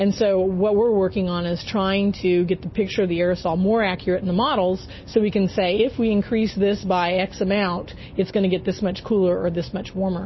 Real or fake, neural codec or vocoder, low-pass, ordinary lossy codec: real; none; 7.2 kHz; MP3, 24 kbps